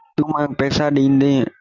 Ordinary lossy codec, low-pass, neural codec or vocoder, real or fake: Opus, 64 kbps; 7.2 kHz; none; real